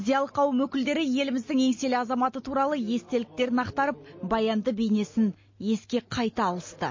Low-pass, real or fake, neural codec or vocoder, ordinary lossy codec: 7.2 kHz; real; none; MP3, 32 kbps